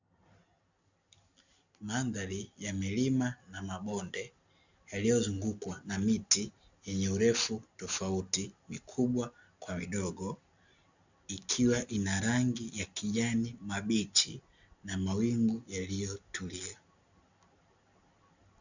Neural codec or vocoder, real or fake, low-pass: none; real; 7.2 kHz